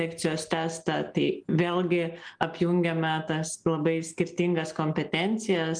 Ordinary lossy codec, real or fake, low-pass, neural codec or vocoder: Opus, 32 kbps; real; 9.9 kHz; none